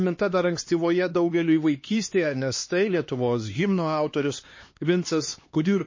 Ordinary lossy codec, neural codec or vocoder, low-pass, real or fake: MP3, 32 kbps; codec, 16 kHz, 2 kbps, X-Codec, HuBERT features, trained on LibriSpeech; 7.2 kHz; fake